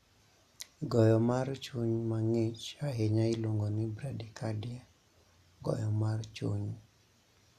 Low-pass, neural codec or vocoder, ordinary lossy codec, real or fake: 14.4 kHz; none; none; real